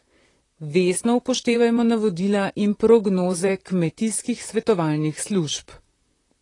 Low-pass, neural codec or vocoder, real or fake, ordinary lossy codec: 10.8 kHz; vocoder, 44.1 kHz, 128 mel bands, Pupu-Vocoder; fake; AAC, 32 kbps